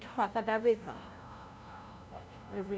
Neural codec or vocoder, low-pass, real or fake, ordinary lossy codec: codec, 16 kHz, 0.5 kbps, FunCodec, trained on LibriTTS, 25 frames a second; none; fake; none